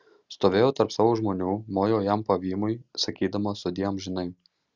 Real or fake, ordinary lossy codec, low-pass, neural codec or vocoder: real; Opus, 64 kbps; 7.2 kHz; none